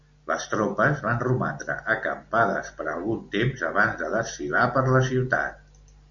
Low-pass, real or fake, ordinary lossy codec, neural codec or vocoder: 7.2 kHz; real; Opus, 64 kbps; none